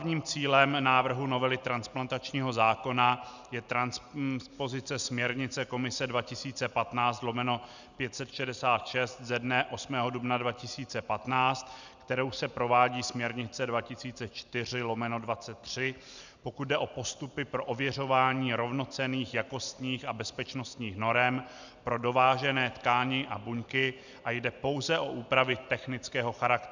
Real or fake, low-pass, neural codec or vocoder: real; 7.2 kHz; none